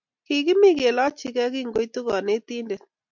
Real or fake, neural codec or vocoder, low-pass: real; none; 7.2 kHz